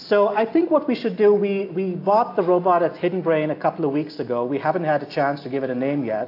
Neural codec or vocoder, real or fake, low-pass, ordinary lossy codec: none; real; 5.4 kHz; AAC, 32 kbps